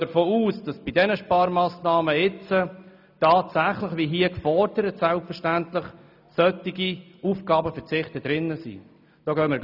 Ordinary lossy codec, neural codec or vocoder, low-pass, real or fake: none; none; 5.4 kHz; real